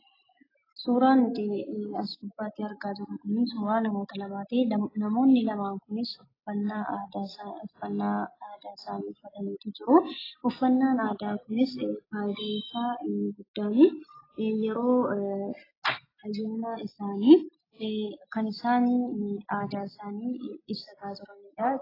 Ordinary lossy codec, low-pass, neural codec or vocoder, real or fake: AAC, 24 kbps; 5.4 kHz; none; real